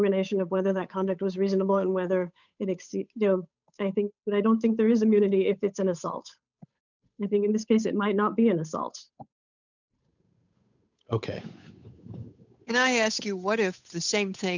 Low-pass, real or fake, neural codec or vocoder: 7.2 kHz; fake; codec, 16 kHz, 8 kbps, FunCodec, trained on Chinese and English, 25 frames a second